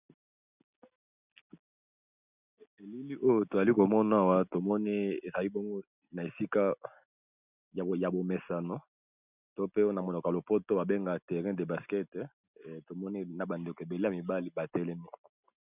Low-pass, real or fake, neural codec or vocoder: 3.6 kHz; real; none